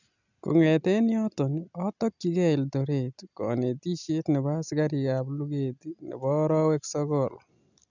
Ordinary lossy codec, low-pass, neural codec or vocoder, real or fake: none; 7.2 kHz; none; real